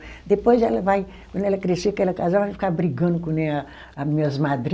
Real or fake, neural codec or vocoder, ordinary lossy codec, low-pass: real; none; none; none